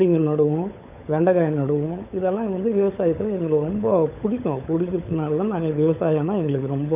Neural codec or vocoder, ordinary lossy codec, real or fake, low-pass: codec, 16 kHz, 16 kbps, FunCodec, trained on LibriTTS, 50 frames a second; none; fake; 3.6 kHz